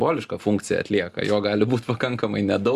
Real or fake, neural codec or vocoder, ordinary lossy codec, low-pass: real; none; Opus, 64 kbps; 14.4 kHz